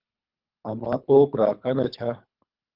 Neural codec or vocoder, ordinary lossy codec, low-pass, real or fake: codec, 24 kHz, 3 kbps, HILCodec; Opus, 32 kbps; 5.4 kHz; fake